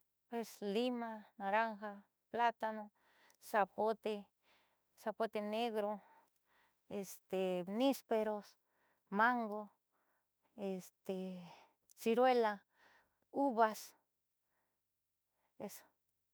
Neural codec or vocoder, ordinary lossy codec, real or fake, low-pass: autoencoder, 48 kHz, 32 numbers a frame, DAC-VAE, trained on Japanese speech; none; fake; none